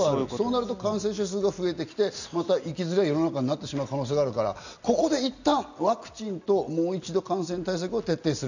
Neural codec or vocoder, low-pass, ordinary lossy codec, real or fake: none; 7.2 kHz; none; real